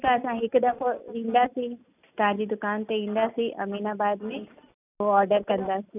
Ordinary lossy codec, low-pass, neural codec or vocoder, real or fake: none; 3.6 kHz; none; real